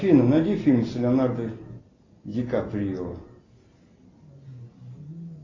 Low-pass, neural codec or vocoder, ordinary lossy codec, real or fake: 7.2 kHz; none; AAC, 32 kbps; real